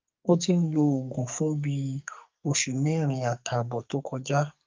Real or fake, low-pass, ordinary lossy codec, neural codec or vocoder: fake; 7.2 kHz; Opus, 24 kbps; codec, 44.1 kHz, 2.6 kbps, SNAC